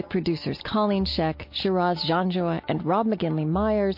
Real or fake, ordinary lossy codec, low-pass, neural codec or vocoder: real; MP3, 32 kbps; 5.4 kHz; none